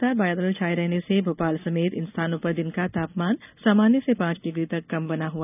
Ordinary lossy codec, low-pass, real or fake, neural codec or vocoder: none; 3.6 kHz; real; none